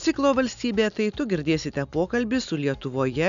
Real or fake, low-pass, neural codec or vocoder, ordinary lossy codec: real; 7.2 kHz; none; MP3, 96 kbps